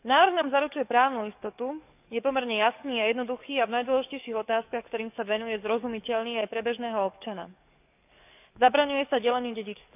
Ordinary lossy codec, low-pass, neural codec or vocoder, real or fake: none; 3.6 kHz; codec, 44.1 kHz, 7.8 kbps, DAC; fake